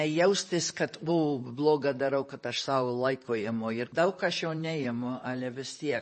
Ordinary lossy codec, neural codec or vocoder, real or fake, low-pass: MP3, 32 kbps; vocoder, 44.1 kHz, 128 mel bands, Pupu-Vocoder; fake; 10.8 kHz